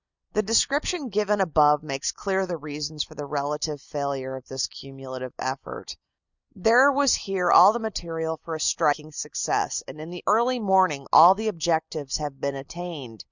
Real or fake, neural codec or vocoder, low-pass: real; none; 7.2 kHz